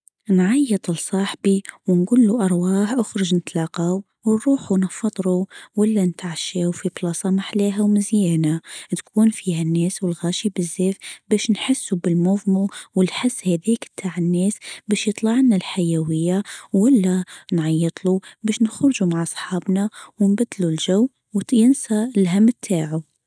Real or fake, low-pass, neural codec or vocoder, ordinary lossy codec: real; none; none; none